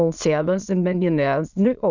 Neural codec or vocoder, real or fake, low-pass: autoencoder, 22.05 kHz, a latent of 192 numbers a frame, VITS, trained on many speakers; fake; 7.2 kHz